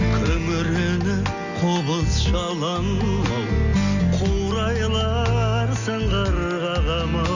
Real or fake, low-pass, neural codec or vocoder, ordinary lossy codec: real; 7.2 kHz; none; none